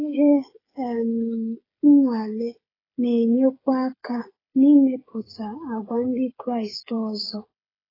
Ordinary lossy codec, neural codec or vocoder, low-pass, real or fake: AAC, 24 kbps; codec, 16 kHz, 8 kbps, FreqCodec, smaller model; 5.4 kHz; fake